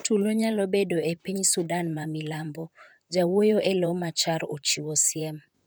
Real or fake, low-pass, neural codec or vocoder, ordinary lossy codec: fake; none; vocoder, 44.1 kHz, 128 mel bands, Pupu-Vocoder; none